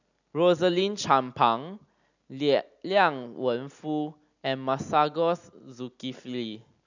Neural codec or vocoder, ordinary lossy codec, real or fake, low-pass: none; none; real; 7.2 kHz